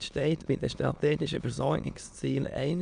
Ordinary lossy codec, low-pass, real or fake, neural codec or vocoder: none; 9.9 kHz; fake; autoencoder, 22.05 kHz, a latent of 192 numbers a frame, VITS, trained on many speakers